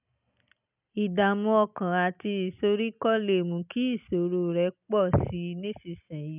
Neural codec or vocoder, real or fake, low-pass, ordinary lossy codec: none; real; 3.6 kHz; none